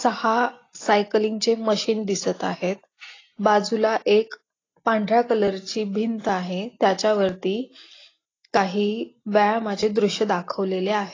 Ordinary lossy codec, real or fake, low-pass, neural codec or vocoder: AAC, 32 kbps; real; 7.2 kHz; none